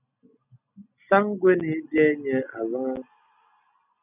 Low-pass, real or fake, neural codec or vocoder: 3.6 kHz; real; none